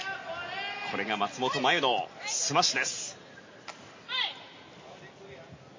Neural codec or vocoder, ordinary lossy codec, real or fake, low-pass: none; MP3, 32 kbps; real; 7.2 kHz